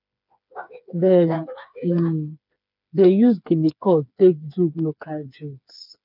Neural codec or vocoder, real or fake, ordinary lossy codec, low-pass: codec, 16 kHz, 4 kbps, FreqCodec, smaller model; fake; none; 5.4 kHz